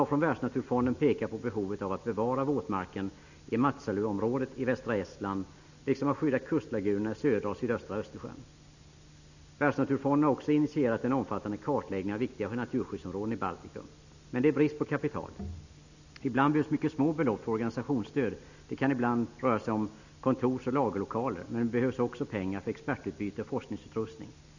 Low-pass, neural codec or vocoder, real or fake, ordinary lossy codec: 7.2 kHz; none; real; none